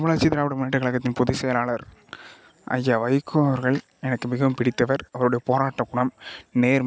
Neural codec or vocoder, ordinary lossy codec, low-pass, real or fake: none; none; none; real